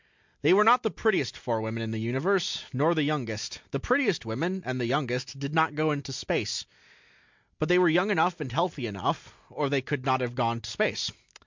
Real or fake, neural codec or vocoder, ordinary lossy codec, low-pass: real; none; MP3, 64 kbps; 7.2 kHz